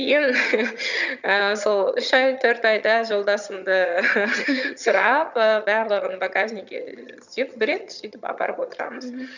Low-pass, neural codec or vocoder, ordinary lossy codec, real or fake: 7.2 kHz; vocoder, 22.05 kHz, 80 mel bands, HiFi-GAN; none; fake